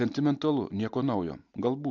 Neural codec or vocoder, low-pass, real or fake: none; 7.2 kHz; real